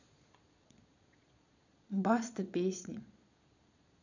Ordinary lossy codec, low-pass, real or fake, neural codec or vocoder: none; 7.2 kHz; fake; vocoder, 22.05 kHz, 80 mel bands, Vocos